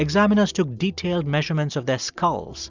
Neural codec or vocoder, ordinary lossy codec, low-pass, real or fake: none; Opus, 64 kbps; 7.2 kHz; real